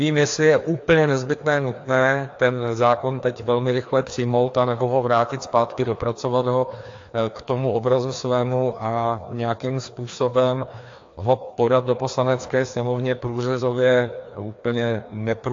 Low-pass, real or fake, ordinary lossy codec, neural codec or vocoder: 7.2 kHz; fake; AAC, 48 kbps; codec, 16 kHz, 2 kbps, FreqCodec, larger model